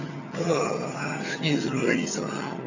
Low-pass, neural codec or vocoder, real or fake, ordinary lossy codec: 7.2 kHz; vocoder, 22.05 kHz, 80 mel bands, HiFi-GAN; fake; none